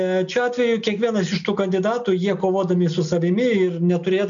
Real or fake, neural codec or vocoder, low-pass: real; none; 7.2 kHz